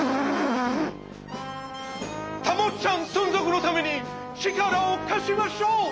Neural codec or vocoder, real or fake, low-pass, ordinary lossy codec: none; real; none; none